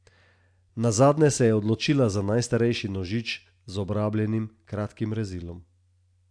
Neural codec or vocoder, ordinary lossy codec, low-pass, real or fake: none; AAC, 64 kbps; 9.9 kHz; real